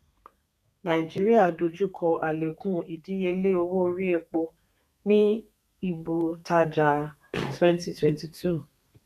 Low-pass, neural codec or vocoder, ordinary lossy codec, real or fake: 14.4 kHz; codec, 32 kHz, 1.9 kbps, SNAC; none; fake